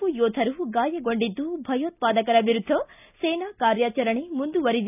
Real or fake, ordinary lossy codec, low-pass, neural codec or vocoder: real; none; 3.6 kHz; none